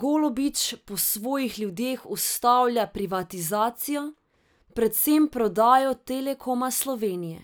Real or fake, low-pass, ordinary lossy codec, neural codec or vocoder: real; none; none; none